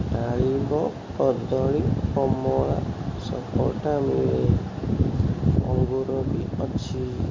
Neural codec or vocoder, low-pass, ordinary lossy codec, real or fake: none; 7.2 kHz; MP3, 32 kbps; real